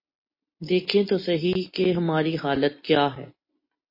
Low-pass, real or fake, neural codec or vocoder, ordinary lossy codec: 5.4 kHz; real; none; MP3, 24 kbps